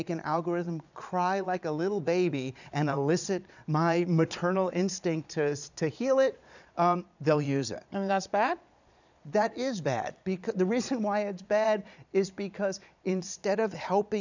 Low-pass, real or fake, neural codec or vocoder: 7.2 kHz; fake; vocoder, 22.05 kHz, 80 mel bands, Vocos